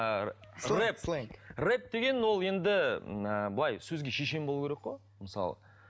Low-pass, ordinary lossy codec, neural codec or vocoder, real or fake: none; none; none; real